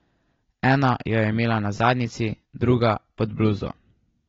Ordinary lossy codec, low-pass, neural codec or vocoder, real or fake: AAC, 24 kbps; 7.2 kHz; none; real